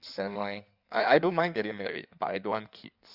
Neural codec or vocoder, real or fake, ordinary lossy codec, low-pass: codec, 16 kHz in and 24 kHz out, 1.1 kbps, FireRedTTS-2 codec; fake; Opus, 64 kbps; 5.4 kHz